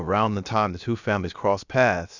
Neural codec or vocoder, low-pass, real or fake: codec, 16 kHz, about 1 kbps, DyCAST, with the encoder's durations; 7.2 kHz; fake